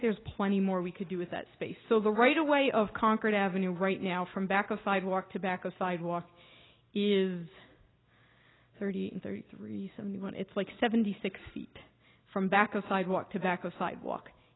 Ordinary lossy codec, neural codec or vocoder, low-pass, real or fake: AAC, 16 kbps; none; 7.2 kHz; real